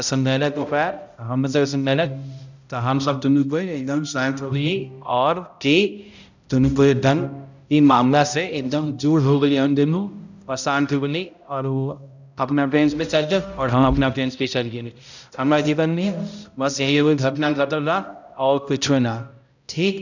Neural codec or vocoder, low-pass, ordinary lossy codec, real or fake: codec, 16 kHz, 0.5 kbps, X-Codec, HuBERT features, trained on balanced general audio; 7.2 kHz; none; fake